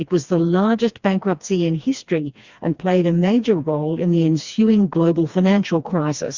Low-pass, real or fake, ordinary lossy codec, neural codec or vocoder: 7.2 kHz; fake; Opus, 64 kbps; codec, 16 kHz, 2 kbps, FreqCodec, smaller model